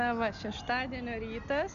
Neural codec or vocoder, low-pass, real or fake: none; 7.2 kHz; real